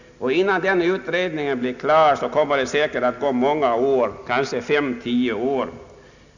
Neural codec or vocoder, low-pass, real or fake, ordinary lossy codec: none; 7.2 kHz; real; none